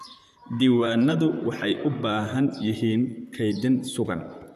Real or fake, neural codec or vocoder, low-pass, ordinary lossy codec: fake; vocoder, 44.1 kHz, 128 mel bands, Pupu-Vocoder; 14.4 kHz; none